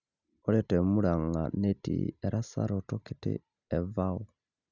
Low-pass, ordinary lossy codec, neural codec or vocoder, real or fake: 7.2 kHz; none; none; real